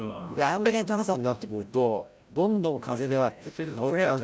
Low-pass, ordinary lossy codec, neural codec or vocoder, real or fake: none; none; codec, 16 kHz, 0.5 kbps, FreqCodec, larger model; fake